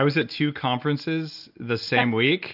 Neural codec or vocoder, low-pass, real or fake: none; 5.4 kHz; real